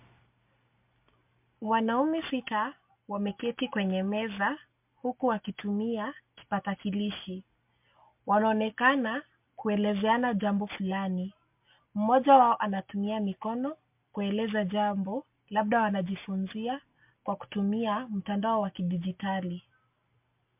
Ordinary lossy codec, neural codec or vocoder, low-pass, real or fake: MP3, 32 kbps; none; 3.6 kHz; real